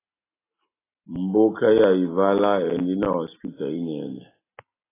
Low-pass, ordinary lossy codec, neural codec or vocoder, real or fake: 3.6 kHz; AAC, 16 kbps; none; real